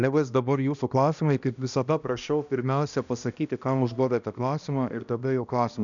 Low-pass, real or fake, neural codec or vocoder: 7.2 kHz; fake; codec, 16 kHz, 1 kbps, X-Codec, HuBERT features, trained on balanced general audio